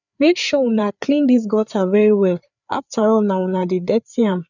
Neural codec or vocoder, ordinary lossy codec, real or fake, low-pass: codec, 16 kHz, 4 kbps, FreqCodec, larger model; none; fake; 7.2 kHz